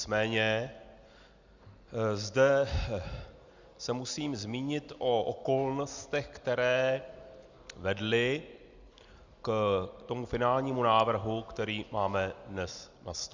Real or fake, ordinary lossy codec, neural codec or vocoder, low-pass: real; Opus, 64 kbps; none; 7.2 kHz